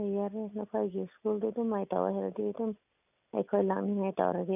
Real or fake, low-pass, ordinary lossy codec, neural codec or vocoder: real; 3.6 kHz; none; none